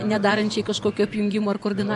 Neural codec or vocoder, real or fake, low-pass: vocoder, 44.1 kHz, 128 mel bands every 512 samples, BigVGAN v2; fake; 10.8 kHz